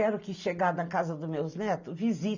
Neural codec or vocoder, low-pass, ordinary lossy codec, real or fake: none; 7.2 kHz; none; real